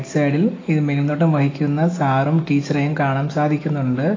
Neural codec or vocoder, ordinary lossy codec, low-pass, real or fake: none; AAC, 32 kbps; 7.2 kHz; real